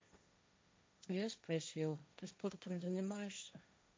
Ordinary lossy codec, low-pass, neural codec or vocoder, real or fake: none; none; codec, 16 kHz, 1.1 kbps, Voila-Tokenizer; fake